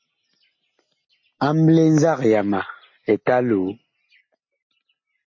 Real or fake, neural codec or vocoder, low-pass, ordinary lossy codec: real; none; 7.2 kHz; MP3, 32 kbps